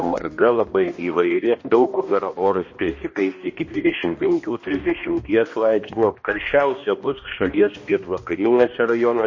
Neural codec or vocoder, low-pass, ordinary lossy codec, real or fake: codec, 16 kHz, 1 kbps, X-Codec, HuBERT features, trained on balanced general audio; 7.2 kHz; MP3, 32 kbps; fake